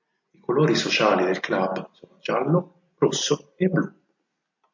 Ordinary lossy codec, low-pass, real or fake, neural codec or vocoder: MP3, 48 kbps; 7.2 kHz; real; none